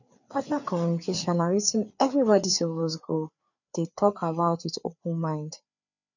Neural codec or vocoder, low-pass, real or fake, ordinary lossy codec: codec, 16 kHz, 4 kbps, FreqCodec, larger model; 7.2 kHz; fake; none